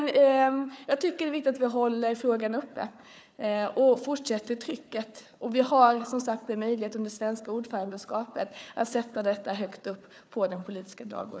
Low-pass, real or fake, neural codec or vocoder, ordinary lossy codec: none; fake; codec, 16 kHz, 4 kbps, FunCodec, trained on Chinese and English, 50 frames a second; none